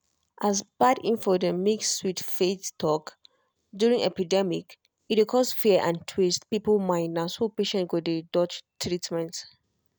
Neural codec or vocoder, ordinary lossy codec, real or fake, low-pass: none; none; real; none